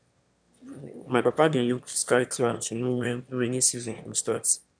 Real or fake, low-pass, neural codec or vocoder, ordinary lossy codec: fake; 9.9 kHz; autoencoder, 22.05 kHz, a latent of 192 numbers a frame, VITS, trained on one speaker; none